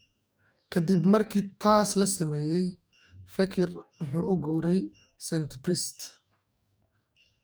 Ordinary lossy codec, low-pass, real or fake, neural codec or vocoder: none; none; fake; codec, 44.1 kHz, 2.6 kbps, DAC